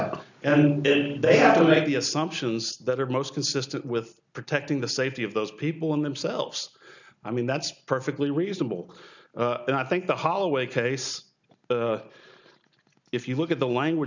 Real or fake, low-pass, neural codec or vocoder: real; 7.2 kHz; none